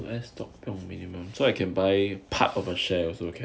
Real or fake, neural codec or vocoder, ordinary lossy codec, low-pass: real; none; none; none